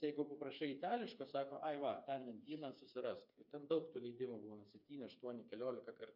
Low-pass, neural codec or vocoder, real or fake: 5.4 kHz; codec, 16 kHz, 4 kbps, FreqCodec, smaller model; fake